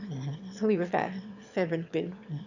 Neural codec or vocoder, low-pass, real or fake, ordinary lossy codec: autoencoder, 22.05 kHz, a latent of 192 numbers a frame, VITS, trained on one speaker; 7.2 kHz; fake; none